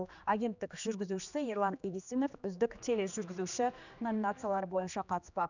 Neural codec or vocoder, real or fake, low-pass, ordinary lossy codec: codec, 16 kHz, 2 kbps, X-Codec, HuBERT features, trained on general audio; fake; 7.2 kHz; none